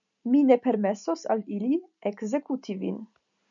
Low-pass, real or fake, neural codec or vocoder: 7.2 kHz; real; none